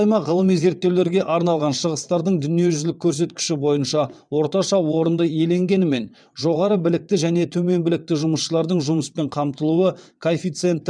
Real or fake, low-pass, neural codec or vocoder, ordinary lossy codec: fake; 9.9 kHz; vocoder, 22.05 kHz, 80 mel bands, WaveNeXt; none